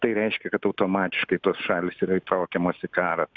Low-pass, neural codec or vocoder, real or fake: 7.2 kHz; none; real